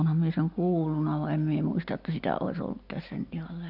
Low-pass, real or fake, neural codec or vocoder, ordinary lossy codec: 5.4 kHz; real; none; none